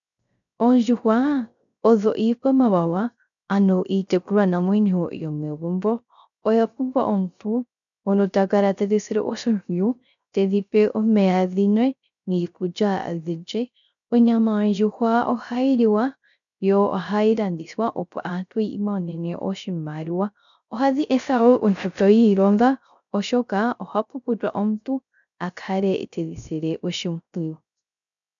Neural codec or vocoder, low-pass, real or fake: codec, 16 kHz, 0.3 kbps, FocalCodec; 7.2 kHz; fake